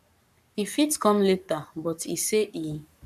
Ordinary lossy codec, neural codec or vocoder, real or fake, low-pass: MP3, 96 kbps; codec, 44.1 kHz, 7.8 kbps, Pupu-Codec; fake; 14.4 kHz